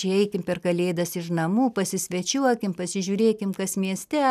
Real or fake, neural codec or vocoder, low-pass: real; none; 14.4 kHz